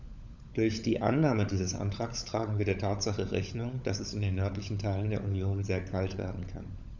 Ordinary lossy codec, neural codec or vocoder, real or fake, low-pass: none; codec, 16 kHz, 16 kbps, FunCodec, trained on LibriTTS, 50 frames a second; fake; 7.2 kHz